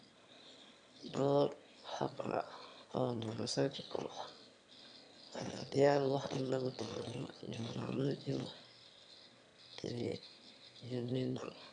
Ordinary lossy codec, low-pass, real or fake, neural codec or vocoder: none; 9.9 kHz; fake; autoencoder, 22.05 kHz, a latent of 192 numbers a frame, VITS, trained on one speaker